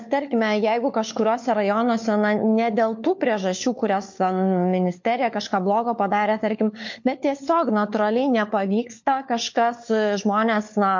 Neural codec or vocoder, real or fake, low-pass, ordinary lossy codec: codec, 16 kHz, 4 kbps, FunCodec, trained on LibriTTS, 50 frames a second; fake; 7.2 kHz; MP3, 48 kbps